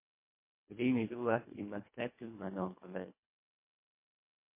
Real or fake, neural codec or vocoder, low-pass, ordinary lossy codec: fake; codec, 24 kHz, 1.5 kbps, HILCodec; 3.6 kHz; MP3, 24 kbps